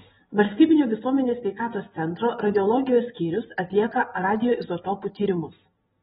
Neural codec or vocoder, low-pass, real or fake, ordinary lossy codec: none; 19.8 kHz; real; AAC, 16 kbps